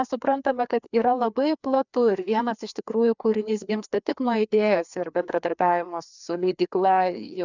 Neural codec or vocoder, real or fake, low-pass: codec, 16 kHz, 2 kbps, FreqCodec, larger model; fake; 7.2 kHz